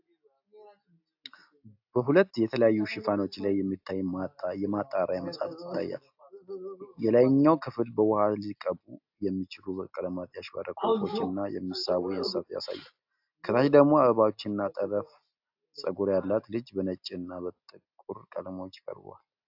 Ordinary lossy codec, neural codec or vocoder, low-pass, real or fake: AAC, 48 kbps; none; 5.4 kHz; real